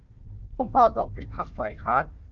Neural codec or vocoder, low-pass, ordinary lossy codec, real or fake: codec, 16 kHz, 1 kbps, FunCodec, trained on Chinese and English, 50 frames a second; 7.2 kHz; Opus, 24 kbps; fake